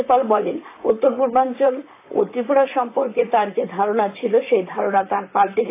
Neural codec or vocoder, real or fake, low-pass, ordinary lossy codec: vocoder, 44.1 kHz, 128 mel bands, Pupu-Vocoder; fake; 3.6 kHz; AAC, 24 kbps